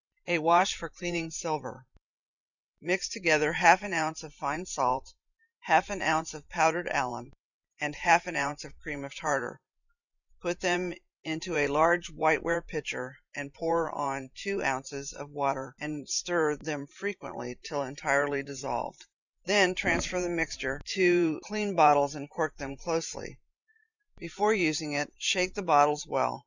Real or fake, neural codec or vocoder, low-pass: fake; vocoder, 44.1 kHz, 128 mel bands every 512 samples, BigVGAN v2; 7.2 kHz